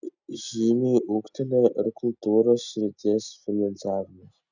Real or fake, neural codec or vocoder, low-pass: real; none; 7.2 kHz